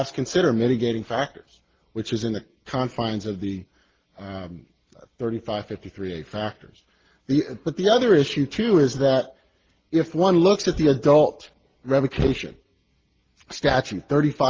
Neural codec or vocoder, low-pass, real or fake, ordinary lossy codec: none; 7.2 kHz; real; Opus, 16 kbps